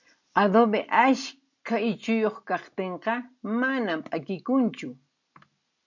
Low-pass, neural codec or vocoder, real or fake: 7.2 kHz; none; real